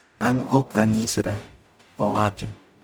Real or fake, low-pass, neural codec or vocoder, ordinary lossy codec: fake; none; codec, 44.1 kHz, 0.9 kbps, DAC; none